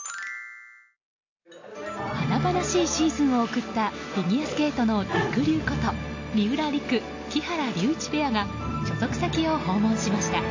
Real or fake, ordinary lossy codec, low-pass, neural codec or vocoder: real; AAC, 48 kbps; 7.2 kHz; none